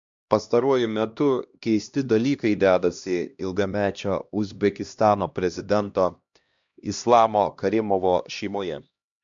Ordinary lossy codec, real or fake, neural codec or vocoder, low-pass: MP3, 64 kbps; fake; codec, 16 kHz, 1 kbps, X-Codec, HuBERT features, trained on LibriSpeech; 7.2 kHz